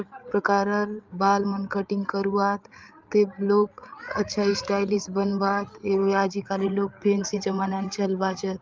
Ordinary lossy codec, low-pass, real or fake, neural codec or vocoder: Opus, 24 kbps; 7.2 kHz; fake; codec, 16 kHz, 8 kbps, FreqCodec, larger model